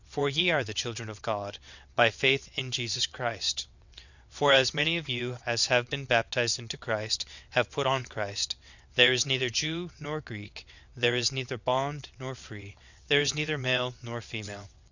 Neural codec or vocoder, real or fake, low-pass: vocoder, 22.05 kHz, 80 mel bands, WaveNeXt; fake; 7.2 kHz